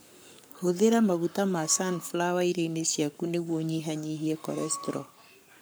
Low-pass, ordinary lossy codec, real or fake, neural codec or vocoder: none; none; fake; codec, 44.1 kHz, 7.8 kbps, Pupu-Codec